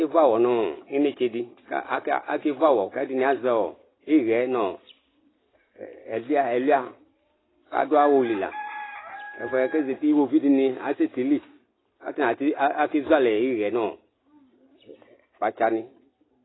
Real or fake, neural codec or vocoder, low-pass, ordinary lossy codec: real; none; 7.2 kHz; AAC, 16 kbps